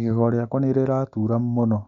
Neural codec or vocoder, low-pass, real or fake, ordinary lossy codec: none; 7.2 kHz; real; none